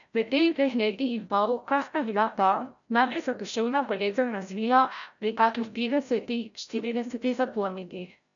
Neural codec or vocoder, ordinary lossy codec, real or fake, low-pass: codec, 16 kHz, 0.5 kbps, FreqCodec, larger model; none; fake; 7.2 kHz